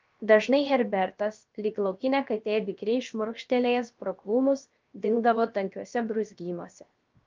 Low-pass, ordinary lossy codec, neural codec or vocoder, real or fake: 7.2 kHz; Opus, 24 kbps; codec, 16 kHz, 0.7 kbps, FocalCodec; fake